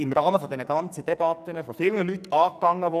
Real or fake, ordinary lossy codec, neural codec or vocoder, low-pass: fake; none; codec, 44.1 kHz, 2.6 kbps, SNAC; 14.4 kHz